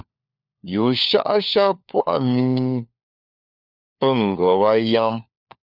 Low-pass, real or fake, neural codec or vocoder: 5.4 kHz; fake; codec, 16 kHz, 4 kbps, FunCodec, trained on LibriTTS, 50 frames a second